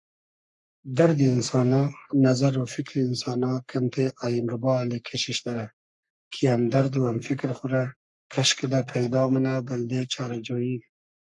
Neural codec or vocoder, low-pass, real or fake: codec, 44.1 kHz, 3.4 kbps, Pupu-Codec; 10.8 kHz; fake